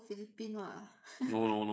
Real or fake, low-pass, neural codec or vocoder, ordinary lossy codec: fake; none; codec, 16 kHz, 8 kbps, FreqCodec, smaller model; none